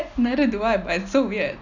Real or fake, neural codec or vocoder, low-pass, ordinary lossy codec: real; none; 7.2 kHz; none